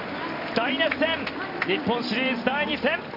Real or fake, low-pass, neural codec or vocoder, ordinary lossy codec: fake; 5.4 kHz; vocoder, 44.1 kHz, 128 mel bands every 256 samples, BigVGAN v2; none